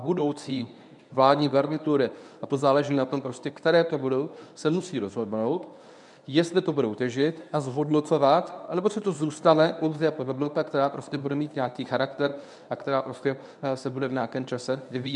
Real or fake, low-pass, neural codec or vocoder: fake; 10.8 kHz; codec, 24 kHz, 0.9 kbps, WavTokenizer, medium speech release version 1